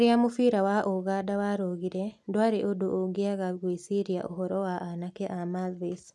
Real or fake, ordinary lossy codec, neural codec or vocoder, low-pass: real; none; none; none